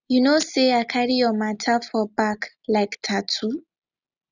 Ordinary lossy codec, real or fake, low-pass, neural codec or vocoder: none; real; 7.2 kHz; none